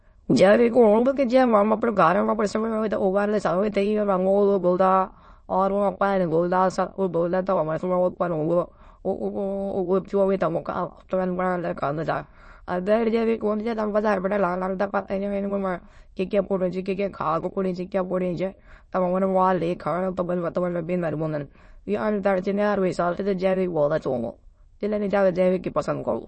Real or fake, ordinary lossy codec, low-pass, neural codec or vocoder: fake; MP3, 32 kbps; 9.9 kHz; autoencoder, 22.05 kHz, a latent of 192 numbers a frame, VITS, trained on many speakers